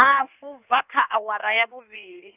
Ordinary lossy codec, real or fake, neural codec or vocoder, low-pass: none; fake; codec, 16 kHz in and 24 kHz out, 1.1 kbps, FireRedTTS-2 codec; 3.6 kHz